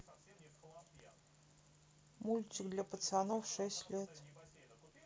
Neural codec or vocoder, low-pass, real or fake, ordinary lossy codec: none; none; real; none